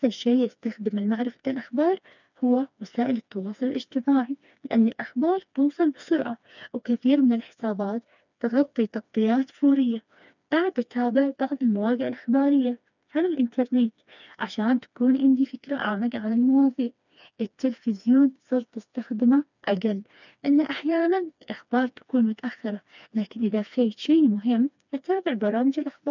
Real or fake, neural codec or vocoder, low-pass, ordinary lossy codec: fake; codec, 16 kHz, 2 kbps, FreqCodec, smaller model; 7.2 kHz; none